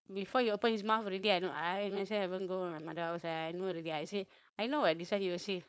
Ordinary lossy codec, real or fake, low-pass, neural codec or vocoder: none; fake; none; codec, 16 kHz, 4.8 kbps, FACodec